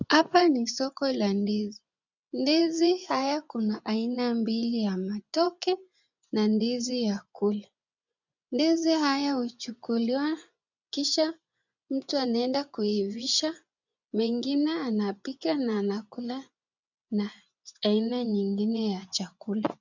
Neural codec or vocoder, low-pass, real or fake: vocoder, 24 kHz, 100 mel bands, Vocos; 7.2 kHz; fake